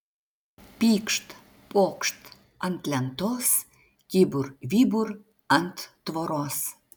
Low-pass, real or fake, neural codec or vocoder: 19.8 kHz; real; none